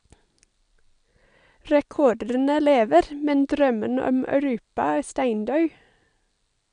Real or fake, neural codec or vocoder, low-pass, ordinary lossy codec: real; none; 9.9 kHz; none